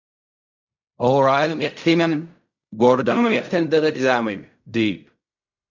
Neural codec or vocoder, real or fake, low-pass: codec, 16 kHz in and 24 kHz out, 0.4 kbps, LongCat-Audio-Codec, fine tuned four codebook decoder; fake; 7.2 kHz